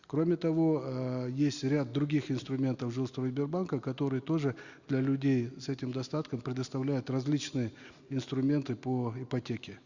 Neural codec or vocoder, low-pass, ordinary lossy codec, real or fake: none; 7.2 kHz; Opus, 64 kbps; real